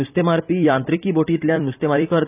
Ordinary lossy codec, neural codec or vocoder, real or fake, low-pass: none; vocoder, 44.1 kHz, 128 mel bands every 256 samples, BigVGAN v2; fake; 3.6 kHz